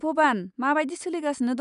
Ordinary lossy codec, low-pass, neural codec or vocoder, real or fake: none; 10.8 kHz; none; real